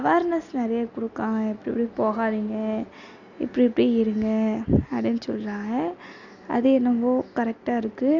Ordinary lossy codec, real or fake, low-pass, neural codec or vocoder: none; real; 7.2 kHz; none